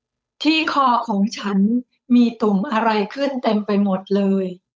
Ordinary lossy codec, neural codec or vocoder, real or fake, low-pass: none; codec, 16 kHz, 8 kbps, FunCodec, trained on Chinese and English, 25 frames a second; fake; none